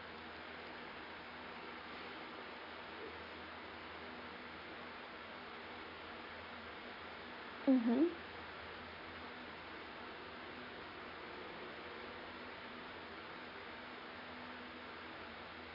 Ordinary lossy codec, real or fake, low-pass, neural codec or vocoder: AAC, 24 kbps; real; 5.4 kHz; none